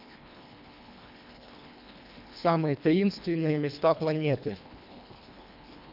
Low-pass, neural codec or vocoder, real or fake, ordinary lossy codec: 5.4 kHz; codec, 24 kHz, 1.5 kbps, HILCodec; fake; none